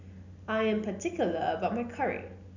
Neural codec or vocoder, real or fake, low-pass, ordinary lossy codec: none; real; 7.2 kHz; none